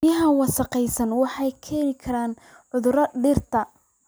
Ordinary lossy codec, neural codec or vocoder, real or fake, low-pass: none; none; real; none